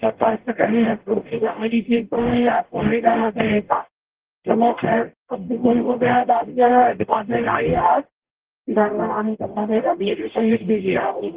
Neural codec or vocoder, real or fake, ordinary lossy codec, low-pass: codec, 44.1 kHz, 0.9 kbps, DAC; fake; Opus, 16 kbps; 3.6 kHz